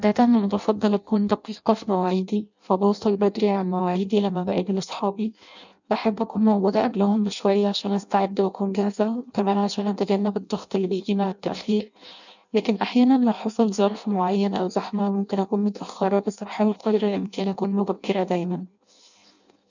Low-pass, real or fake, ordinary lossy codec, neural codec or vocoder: 7.2 kHz; fake; none; codec, 16 kHz in and 24 kHz out, 0.6 kbps, FireRedTTS-2 codec